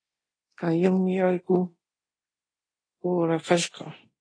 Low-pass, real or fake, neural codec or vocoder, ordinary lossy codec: 9.9 kHz; fake; codec, 24 kHz, 0.9 kbps, DualCodec; AAC, 32 kbps